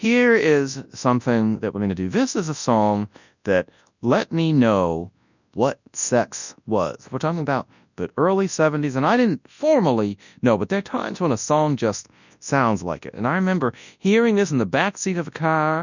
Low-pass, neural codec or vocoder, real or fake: 7.2 kHz; codec, 24 kHz, 0.9 kbps, WavTokenizer, large speech release; fake